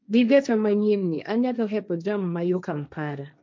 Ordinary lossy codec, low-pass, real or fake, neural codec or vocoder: none; none; fake; codec, 16 kHz, 1.1 kbps, Voila-Tokenizer